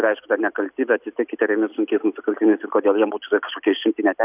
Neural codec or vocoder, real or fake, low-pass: none; real; 3.6 kHz